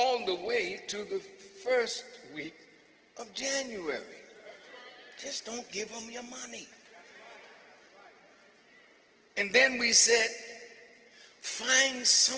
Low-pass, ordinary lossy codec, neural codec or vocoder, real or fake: 7.2 kHz; Opus, 16 kbps; none; real